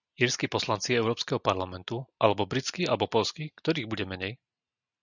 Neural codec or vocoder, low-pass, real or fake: none; 7.2 kHz; real